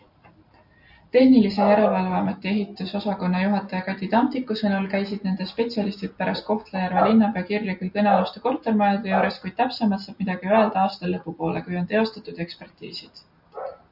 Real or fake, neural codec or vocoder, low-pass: real; none; 5.4 kHz